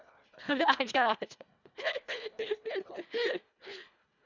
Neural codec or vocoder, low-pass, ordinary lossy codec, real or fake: codec, 24 kHz, 1.5 kbps, HILCodec; 7.2 kHz; none; fake